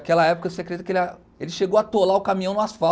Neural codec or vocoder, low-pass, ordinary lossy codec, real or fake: none; none; none; real